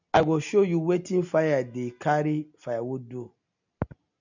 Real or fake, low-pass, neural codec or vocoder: real; 7.2 kHz; none